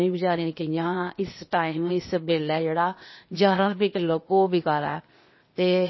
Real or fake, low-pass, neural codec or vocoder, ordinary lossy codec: fake; 7.2 kHz; codec, 16 kHz, 0.8 kbps, ZipCodec; MP3, 24 kbps